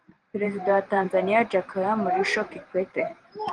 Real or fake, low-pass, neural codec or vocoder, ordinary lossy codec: fake; 10.8 kHz; vocoder, 44.1 kHz, 128 mel bands every 512 samples, BigVGAN v2; Opus, 24 kbps